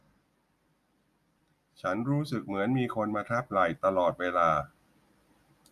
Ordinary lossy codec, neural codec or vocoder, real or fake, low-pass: none; none; real; 14.4 kHz